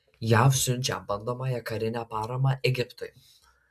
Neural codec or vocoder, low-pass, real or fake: none; 14.4 kHz; real